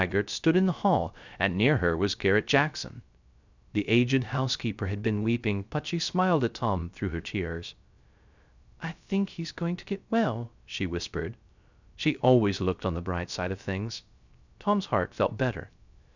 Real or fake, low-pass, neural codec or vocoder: fake; 7.2 kHz; codec, 16 kHz, 0.3 kbps, FocalCodec